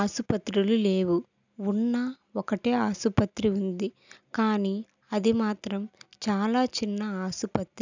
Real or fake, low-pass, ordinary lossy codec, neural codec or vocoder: real; 7.2 kHz; none; none